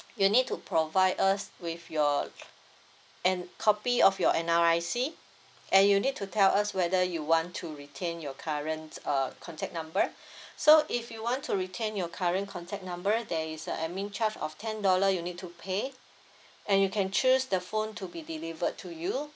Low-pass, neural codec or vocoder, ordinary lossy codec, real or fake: none; none; none; real